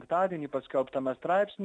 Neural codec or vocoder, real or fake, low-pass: none; real; 9.9 kHz